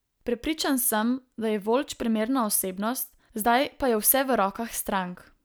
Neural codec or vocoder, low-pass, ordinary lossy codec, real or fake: none; none; none; real